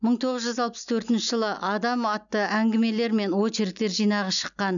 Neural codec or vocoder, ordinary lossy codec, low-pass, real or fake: none; none; 7.2 kHz; real